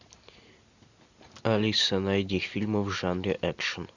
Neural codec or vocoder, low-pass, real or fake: none; 7.2 kHz; real